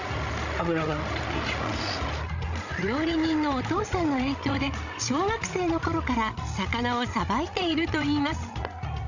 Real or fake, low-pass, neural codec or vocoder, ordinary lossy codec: fake; 7.2 kHz; codec, 16 kHz, 16 kbps, FreqCodec, larger model; none